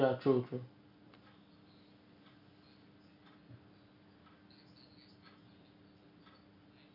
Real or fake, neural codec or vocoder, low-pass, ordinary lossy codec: real; none; 5.4 kHz; none